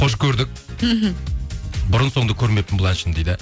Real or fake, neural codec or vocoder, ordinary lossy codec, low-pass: real; none; none; none